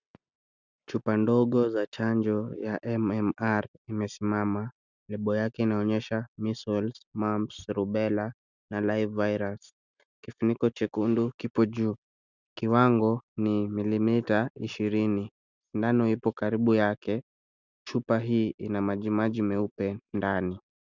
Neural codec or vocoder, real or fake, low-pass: none; real; 7.2 kHz